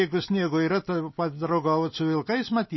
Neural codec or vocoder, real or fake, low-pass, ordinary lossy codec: none; real; 7.2 kHz; MP3, 24 kbps